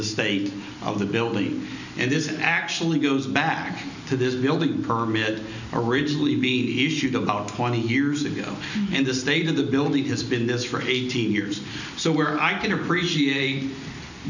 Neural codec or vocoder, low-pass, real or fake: none; 7.2 kHz; real